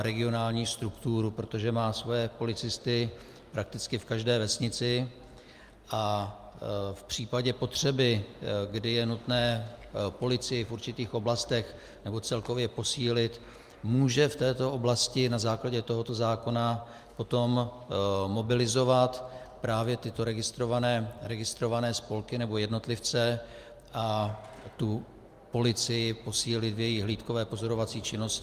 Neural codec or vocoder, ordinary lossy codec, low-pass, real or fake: none; Opus, 32 kbps; 14.4 kHz; real